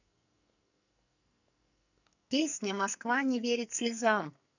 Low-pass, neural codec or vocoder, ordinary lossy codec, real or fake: 7.2 kHz; codec, 44.1 kHz, 2.6 kbps, SNAC; none; fake